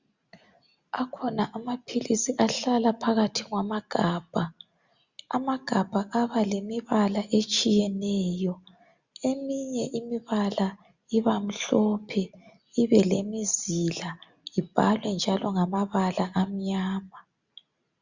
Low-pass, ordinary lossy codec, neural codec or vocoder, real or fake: 7.2 kHz; Opus, 64 kbps; none; real